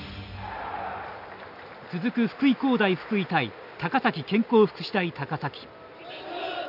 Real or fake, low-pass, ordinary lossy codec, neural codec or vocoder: real; 5.4 kHz; none; none